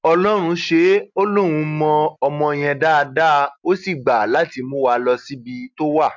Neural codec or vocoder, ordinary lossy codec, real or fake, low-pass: none; MP3, 64 kbps; real; 7.2 kHz